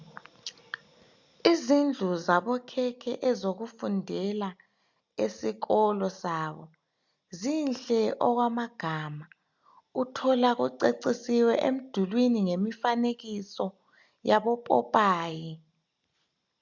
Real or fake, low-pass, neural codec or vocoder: real; 7.2 kHz; none